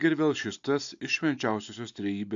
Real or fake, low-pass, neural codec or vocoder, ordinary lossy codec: real; 7.2 kHz; none; AAC, 64 kbps